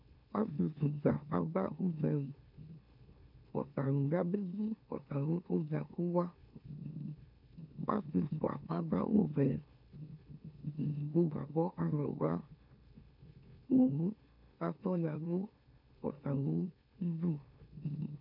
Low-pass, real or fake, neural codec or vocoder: 5.4 kHz; fake; autoencoder, 44.1 kHz, a latent of 192 numbers a frame, MeloTTS